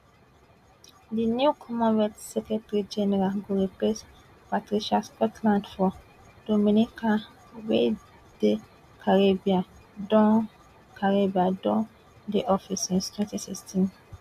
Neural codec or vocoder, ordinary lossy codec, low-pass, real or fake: none; AAC, 96 kbps; 14.4 kHz; real